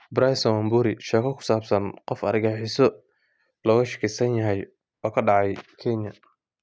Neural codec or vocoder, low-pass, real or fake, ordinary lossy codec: none; none; real; none